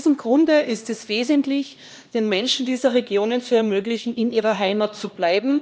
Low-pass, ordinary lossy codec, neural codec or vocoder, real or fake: none; none; codec, 16 kHz, 1 kbps, X-Codec, HuBERT features, trained on LibriSpeech; fake